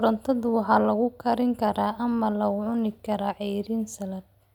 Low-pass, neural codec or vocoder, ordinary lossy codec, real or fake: 19.8 kHz; none; none; real